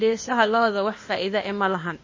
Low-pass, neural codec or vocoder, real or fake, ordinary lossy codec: 7.2 kHz; codec, 16 kHz, 0.8 kbps, ZipCodec; fake; MP3, 32 kbps